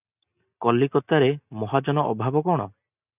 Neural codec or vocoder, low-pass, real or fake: none; 3.6 kHz; real